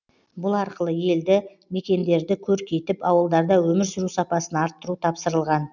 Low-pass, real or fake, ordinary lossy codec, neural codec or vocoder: 7.2 kHz; real; none; none